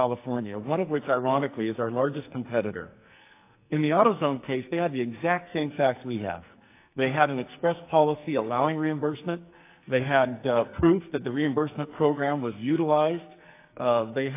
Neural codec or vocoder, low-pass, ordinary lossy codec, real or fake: codec, 44.1 kHz, 2.6 kbps, SNAC; 3.6 kHz; AAC, 24 kbps; fake